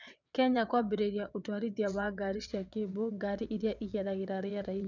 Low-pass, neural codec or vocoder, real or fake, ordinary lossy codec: 7.2 kHz; vocoder, 22.05 kHz, 80 mel bands, WaveNeXt; fake; none